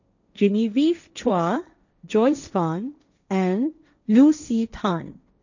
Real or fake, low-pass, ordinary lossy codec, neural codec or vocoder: fake; 7.2 kHz; none; codec, 16 kHz, 1.1 kbps, Voila-Tokenizer